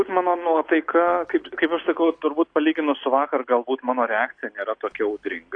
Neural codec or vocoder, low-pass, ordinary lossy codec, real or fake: none; 9.9 kHz; AAC, 48 kbps; real